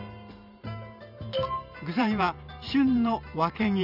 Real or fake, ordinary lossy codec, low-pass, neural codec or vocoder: fake; none; 5.4 kHz; vocoder, 44.1 kHz, 128 mel bands every 512 samples, BigVGAN v2